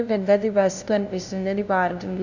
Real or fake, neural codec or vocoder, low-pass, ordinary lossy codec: fake; codec, 16 kHz, 0.5 kbps, FunCodec, trained on LibriTTS, 25 frames a second; 7.2 kHz; none